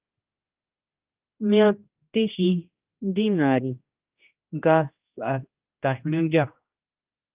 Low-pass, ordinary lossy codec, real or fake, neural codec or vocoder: 3.6 kHz; Opus, 24 kbps; fake; codec, 16 kHz, 1 kbps, X-Codec, HuBERT features, trained on general audio